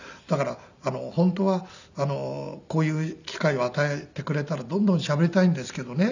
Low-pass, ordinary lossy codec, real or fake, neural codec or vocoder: 7.2 kHz; none; real; none